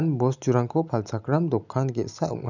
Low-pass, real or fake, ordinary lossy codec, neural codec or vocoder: 7.2 kHz; real; none; none